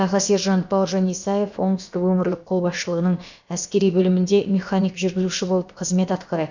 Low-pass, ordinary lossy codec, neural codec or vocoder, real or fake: 7.2 kHz; none; codec, 16 kHz, about 1 kbps, DyCAST, with the encoder's durations; fake